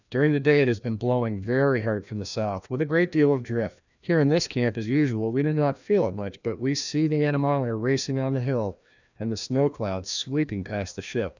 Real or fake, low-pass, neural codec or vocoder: fake; 7.2 kHz; codec, 16 kHz, 1 kbps, FreqCodec, larger model